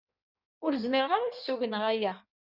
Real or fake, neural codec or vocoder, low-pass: fake; codec, 16 kHz in and 24 kHz out, 1.1 kbps, FireRedTTS-2 codec; 5.4 kHz